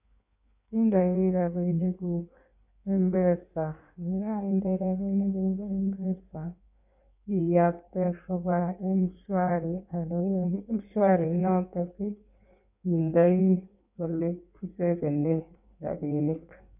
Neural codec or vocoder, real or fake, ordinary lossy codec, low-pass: codec, 16 kHz in and 24 kHz out, 1.1 kbps, FireRedTTS-2 codec; fake; none; 3.6 kHz